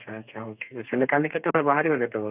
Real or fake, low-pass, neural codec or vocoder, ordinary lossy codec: fake; 3.6 kHz; codec, 32 kHz, 1.9 kbps, SNAC; none